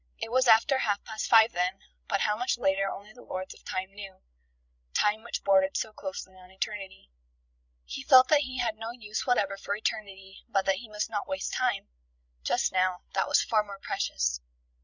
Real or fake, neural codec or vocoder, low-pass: fake; codec, 16 kHz, 16 kbps, FreqCodec, larger model; 7.2 kHz